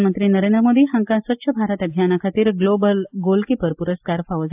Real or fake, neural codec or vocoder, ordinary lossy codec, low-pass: real; none; AAC, 32 kbps; 3.6 kHz